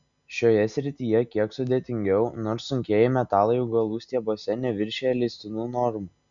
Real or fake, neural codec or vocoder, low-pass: real; none; 7.2 kHz